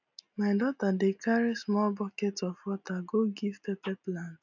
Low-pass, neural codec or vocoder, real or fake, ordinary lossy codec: 7.2 kHz; none; real; none